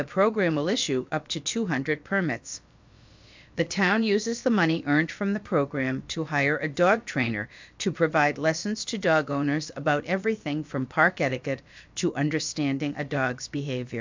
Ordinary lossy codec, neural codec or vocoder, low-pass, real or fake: MP3, 64 kbps; codec, 16 kHz, about 1 kbps, DyCAST, with the encoder's durations; 7.2 kHz; fake